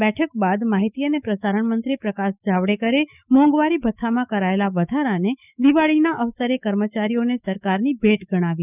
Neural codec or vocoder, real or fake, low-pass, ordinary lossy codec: autoencoder, 48 kHz, 128 numbers a frame, DAC-VAE, trained on Japanese speech; fake; 3.6 kHz; none